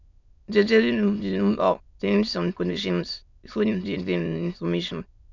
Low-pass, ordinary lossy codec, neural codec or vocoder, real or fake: 7.2 kHz; none; autoencoder, 22.05 kHz, a latent of 192 numbers a frame, VITS, trained on many speakers; fake